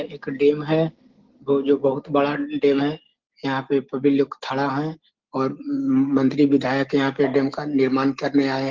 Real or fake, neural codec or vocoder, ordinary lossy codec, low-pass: real; none; Opus, 16 kbps; 7.2 kHz